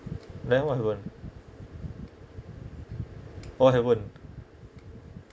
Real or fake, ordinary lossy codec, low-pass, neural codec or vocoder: real; none; none; none